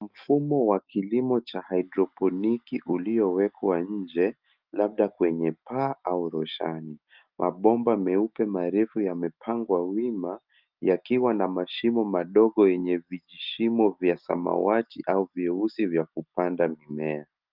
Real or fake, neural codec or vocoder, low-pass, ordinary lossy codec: real; none; 5.4 kHz; Opus, 24 kbps